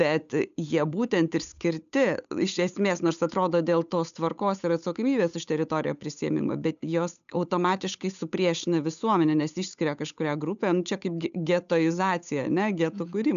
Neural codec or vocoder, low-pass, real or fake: codec, 16 kHz, 8 kbps, FunCodec, trained on Chinese and English, 25 frames a second; 7.2 kHz; fake